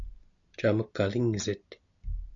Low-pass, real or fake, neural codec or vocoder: 7.2 kHz; real; none